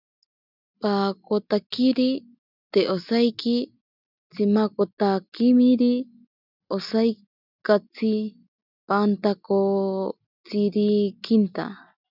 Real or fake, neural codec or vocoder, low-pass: real; none; 5.4 kHz